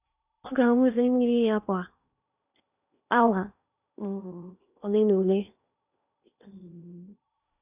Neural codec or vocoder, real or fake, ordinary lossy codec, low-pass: codec, 16 kHz in and 24 kHz out, 0.8 kbps, FocalCodec, streaming, 65536 codes; fake; none; 3.6 kHz